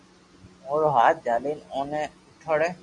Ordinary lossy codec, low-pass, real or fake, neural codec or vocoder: Opus, 64 kbps; 10.8 kHz; real; none